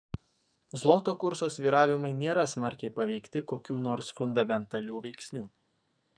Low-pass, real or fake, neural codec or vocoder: 9.9 kHz; fake; codec, 44.1 kHz, 2.6 kbps, SNAC